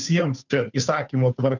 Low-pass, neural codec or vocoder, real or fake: 7.2 kHz; codec, 16 kHz, 2 kbps, FunCodec, trained on Chinese and English, 25 frames a second; fake